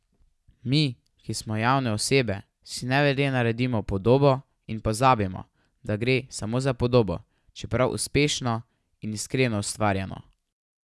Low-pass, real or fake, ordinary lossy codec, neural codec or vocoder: none; real; none; none